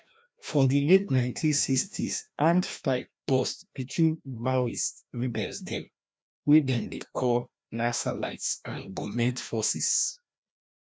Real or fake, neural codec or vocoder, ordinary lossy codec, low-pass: fake; codec, 16 kHz, 1 kbps, FreqCodec, larger model; none; none